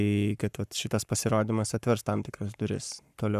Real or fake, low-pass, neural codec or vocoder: fake; 14.4 kHz; codec, 44.1 kHz, 7.8 kbps, Pupu-Codec